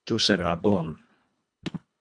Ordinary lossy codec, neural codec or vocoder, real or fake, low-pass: AAC, 64 kbps; codec, 24 kHz, 1.5 kbps, HILCodec; fake; 9.9 kHz